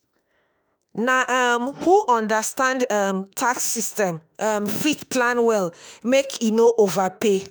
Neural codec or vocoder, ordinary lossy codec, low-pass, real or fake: autoencoder, 48 kHz, 32 numbers a frame, DAC-VAE, trained on Japanese speech; none; none; fake